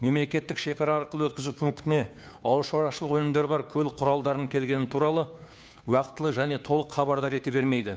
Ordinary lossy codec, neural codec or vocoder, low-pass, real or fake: none; codec, 16 kHz, 2 kbps, FunCodec, trained on Chinese and English, 25 frames a second; none; fake